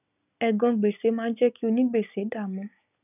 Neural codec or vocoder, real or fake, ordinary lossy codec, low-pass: none; real; none; 3.6 kHz